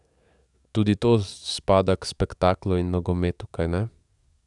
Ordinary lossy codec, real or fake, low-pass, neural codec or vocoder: none; fake; 10.8 kHz; codec, 44.1 kHz, 7.8 kbps, DAC